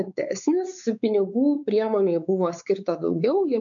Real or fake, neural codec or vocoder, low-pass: fake; codec, 16 kHz, 4 kbps, X-Codec, WavLM features, trained on Multilingual LibriSpeech; 7.2 kHz